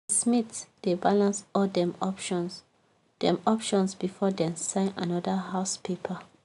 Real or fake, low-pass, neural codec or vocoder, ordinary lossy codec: real; 10.8 kHz; none; none